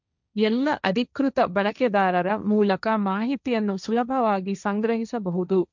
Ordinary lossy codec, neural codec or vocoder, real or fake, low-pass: none; codec, 16 kHz, 1.1 kbps, Voila-Tokenizer; fake; 7.2 kHz